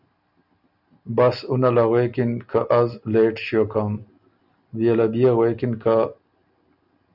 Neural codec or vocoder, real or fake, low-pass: none; real; 5.4 kHz